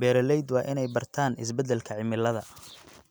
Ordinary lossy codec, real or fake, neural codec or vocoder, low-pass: none; real; none; none